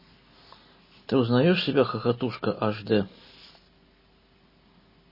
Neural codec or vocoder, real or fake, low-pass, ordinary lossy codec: none; real; 5.4 kHz; MP3, 24 kbps